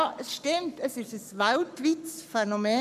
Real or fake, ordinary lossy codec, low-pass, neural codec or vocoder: fake; none; 14.4 kHz; codec, 44.1 kHz, 7.8 kbps, Pupu-Codec